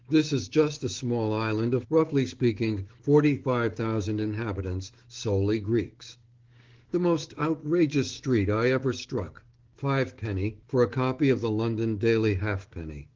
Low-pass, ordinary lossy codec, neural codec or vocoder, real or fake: 7.2 kHz; Opus, 16 kbps; codec, 16 kHz, 6 kbps, DAC; fake